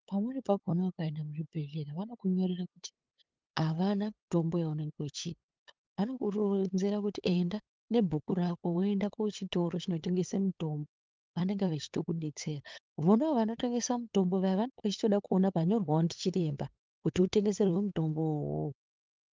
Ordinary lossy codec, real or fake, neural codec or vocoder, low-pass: Opus, 32 kbps; fake; codec, 16 kHz, 8 kbps, FunCodec, trained on LibriTTS, 25 frames a second; 7.2 kHz